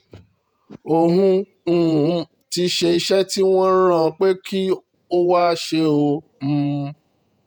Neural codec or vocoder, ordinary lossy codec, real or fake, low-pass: vocoder, 48 kHz, 128 mel bands, Vocos; none; fake; none